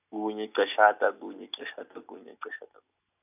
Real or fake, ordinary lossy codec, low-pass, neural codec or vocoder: real; none; 3.6 kHz; none